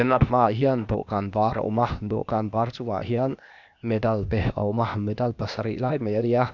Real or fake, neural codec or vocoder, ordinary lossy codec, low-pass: fake; codec, 16 kHz, 0.8 kbps, ZipCodec; none; 7.2 kHz